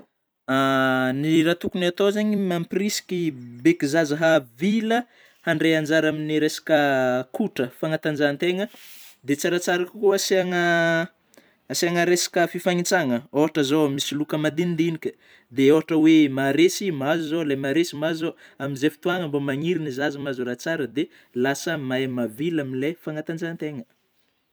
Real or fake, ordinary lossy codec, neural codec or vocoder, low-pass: fake; none; vocoder, 44.1 kHz, 128 mel bands every 256 samples, BigVGAN v2; none